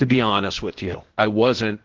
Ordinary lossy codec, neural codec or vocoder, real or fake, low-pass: Opus, 16 kbps; codec, 16 kHz, 0.8 kbps, ZipCodec; fake; 7.2 kHz